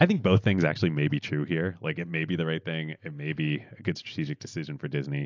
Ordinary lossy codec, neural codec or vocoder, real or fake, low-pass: AAC, 48 kbps; none; real; 7.2 kHz